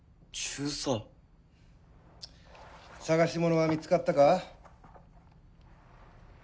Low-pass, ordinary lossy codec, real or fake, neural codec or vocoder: none; none; real; none